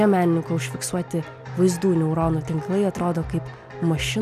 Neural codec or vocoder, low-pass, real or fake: none; 14.4 kHz; real